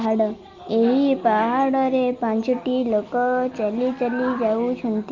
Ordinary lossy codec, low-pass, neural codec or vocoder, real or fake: Opus, 24 kbps; 7.2 kHz; none; real